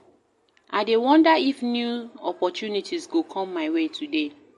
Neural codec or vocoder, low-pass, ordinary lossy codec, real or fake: none; 14.4 kHz; MP3, 48 kbps; real